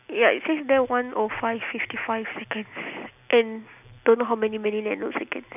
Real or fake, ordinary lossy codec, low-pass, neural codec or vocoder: real; none; 3.6 kHz; none